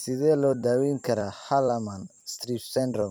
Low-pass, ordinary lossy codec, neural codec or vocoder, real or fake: none; none; vocoder, 44.1 kHz, 128 mel bands every 256 samples, BigVGAN v2; fake